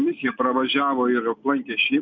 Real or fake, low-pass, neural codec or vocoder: real; 7.2 kHz; none